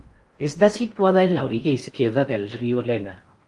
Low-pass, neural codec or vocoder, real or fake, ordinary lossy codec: 10.8 kHz; codec, 16 kHz in and 24 kHz out, 0.6 kbps, FocalCodec, streaming, 4096 codes; fake; Opus, 32 kbps